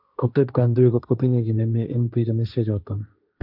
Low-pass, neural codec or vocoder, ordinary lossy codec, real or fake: 5.4 kHz; codec, 16 kHz, 1.1 kbps, Voila-Tokenizer; none; fake